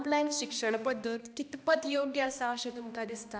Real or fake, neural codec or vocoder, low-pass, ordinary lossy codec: fake; codec, 16 kHz, 1 kbps, X-Codec, HuBERT features, trained on balanced general audio; none; none